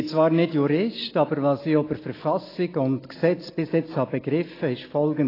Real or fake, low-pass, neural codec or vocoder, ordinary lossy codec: real; 5.4 kHz; none; AAC, 24 kbps